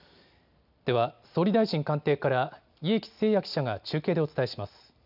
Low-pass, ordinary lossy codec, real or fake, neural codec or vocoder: 5.4 kHz; none; real; none